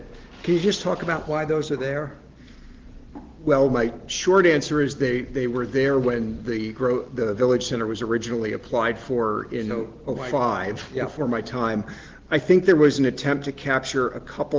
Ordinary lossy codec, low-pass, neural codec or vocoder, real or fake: Opus, 16 kbps; 7.2 kHz; none; real